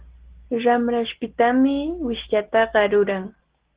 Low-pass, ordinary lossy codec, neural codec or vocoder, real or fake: 3.6 kHz; Opus, 16 kbps; none; real